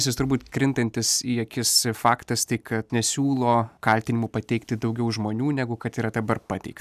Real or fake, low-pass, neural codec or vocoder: real; 14.4 kHz; none